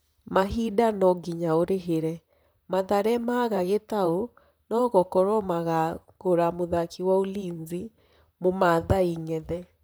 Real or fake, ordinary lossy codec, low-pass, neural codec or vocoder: fake; none; none; vocoder, 44.1 kHz, 128 mel bands, Pupu-Vocoder